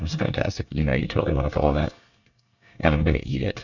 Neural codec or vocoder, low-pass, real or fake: codec, 24 kHz, 1 kbps, SNAC; 7.2 kHz; fake